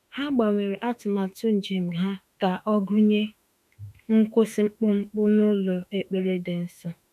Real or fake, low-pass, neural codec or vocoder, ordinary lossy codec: fake; 14.4 kHz; autoencoder, 48 kHz, 32 numbers a frame, DAC-VAE, trained on Japanese speech; none